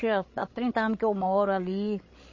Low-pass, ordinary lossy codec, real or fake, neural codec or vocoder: 7.2 kHz; MP3, 32 kbps; fake; codec, 16 kHz, 16 kbps, FreqCodec, larger model